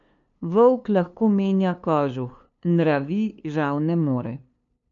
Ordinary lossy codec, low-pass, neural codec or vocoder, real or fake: MP3, 64 kbps; 7.2 kHz; codec, 16 kHz, 2 kbps, FunCodec, trained on LibriTTS, 25 frames a second; fake